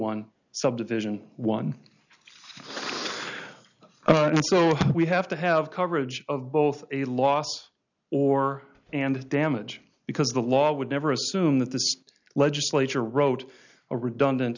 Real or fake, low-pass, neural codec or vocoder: real; 7.2 kHz; none